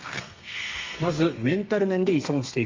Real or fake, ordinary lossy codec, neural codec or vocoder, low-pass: fake; Opus, 32 kbps; codec, 32 kHz, 1.9 kbps, SNAC; 7.2 kHz